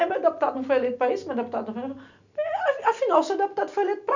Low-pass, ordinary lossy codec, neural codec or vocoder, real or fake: 7.2 kHz; none; none; real